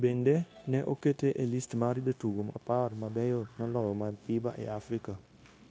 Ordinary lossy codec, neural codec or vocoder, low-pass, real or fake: none; codec, 16 kHz, 0.9 kbps, LongCat-Audio-Codec; none; fake